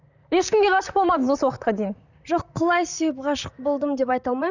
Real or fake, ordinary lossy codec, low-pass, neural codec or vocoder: fake; none; 7.2 kHz; vocoder, 44.1 kHz, 128 mel bands, Pupu-Vocoder